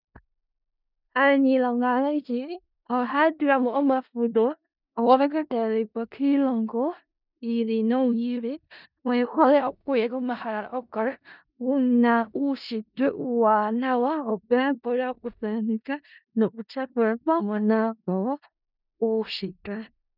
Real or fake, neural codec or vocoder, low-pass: fake; codec, 16 kHz in and 24 kHz out, 0.4 kbps, LongCat-Audio-Codec, four codebook decoder; 5.4 kHz